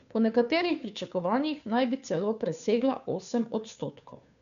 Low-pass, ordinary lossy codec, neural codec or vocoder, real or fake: 7.2 kHz; none; codec, 16 kHz, 2 kbps, FunCodec, trained on Chinese and English, 25 frames a second; fake